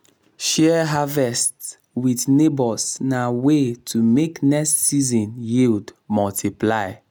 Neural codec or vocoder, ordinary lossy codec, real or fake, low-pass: none; none; real; none